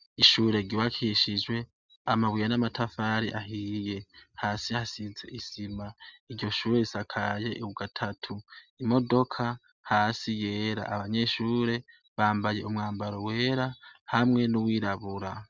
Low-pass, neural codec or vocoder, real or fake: 7.2 kHz; none; real